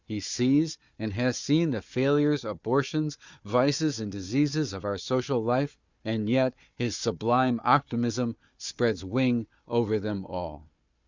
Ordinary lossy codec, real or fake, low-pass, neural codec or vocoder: Opus, 64 kbps; fake; 7.2 kHz; codec, 16 kHz, 4 kbps, FunCodec, trained on Chinese and English, 50 frames a second